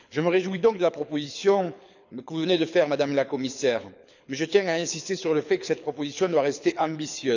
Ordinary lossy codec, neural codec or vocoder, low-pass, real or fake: none; codec, 24 kHz, 6 kbps, HILCodec; 7.2 kHz; fake